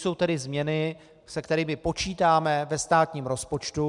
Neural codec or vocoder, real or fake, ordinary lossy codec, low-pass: none; real; MP3, 96 kbps; 10.8 kHz